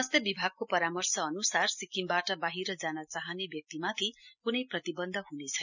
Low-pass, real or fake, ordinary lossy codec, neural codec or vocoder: 7.2 kHz; real; none; none